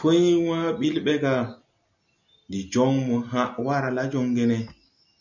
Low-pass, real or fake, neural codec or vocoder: 7.2 kHz; real; none